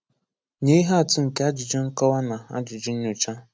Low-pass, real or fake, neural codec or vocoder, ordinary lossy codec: none; real; none; none